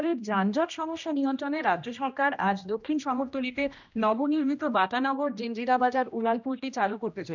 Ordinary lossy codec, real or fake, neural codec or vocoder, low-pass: none; fake; codec, 16 kHz, 1 kbps, X-Codec, HuBERT features, trained on general audio; 7.2 kHz